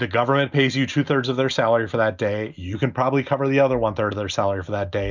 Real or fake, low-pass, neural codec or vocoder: real; 7.2 kHz; none